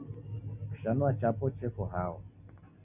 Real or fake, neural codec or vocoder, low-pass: real; none; 3.6 kHz